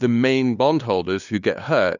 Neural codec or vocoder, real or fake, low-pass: codec, 16 kHz, 2 kbps, FunCodec, trained on LibriTTS, 25 frames a second; fake; 7.2 kHz